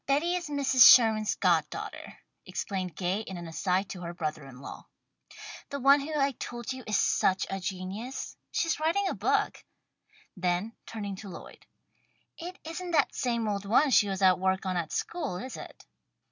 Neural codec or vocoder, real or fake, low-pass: none; real; 7.2 kHz